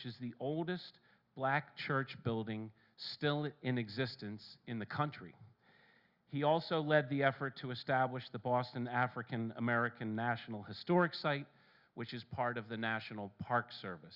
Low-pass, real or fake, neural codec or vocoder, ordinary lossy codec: 5.4 kHz; real; none; Opus, 64 kbps